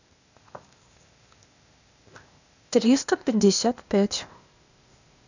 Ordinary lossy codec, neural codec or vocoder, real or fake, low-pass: none; codec, 16 kHz, 0.8 kbps, ZipCodec; fake; 7.2 kHz